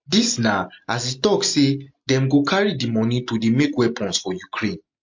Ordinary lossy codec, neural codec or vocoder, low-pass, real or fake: MP3, 48 kbps; none; 7.2 kHz; real